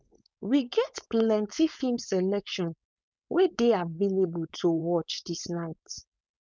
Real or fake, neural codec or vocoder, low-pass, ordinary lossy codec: fake; codec, 16 kHz, 4.8 kbps, FACodec; none; none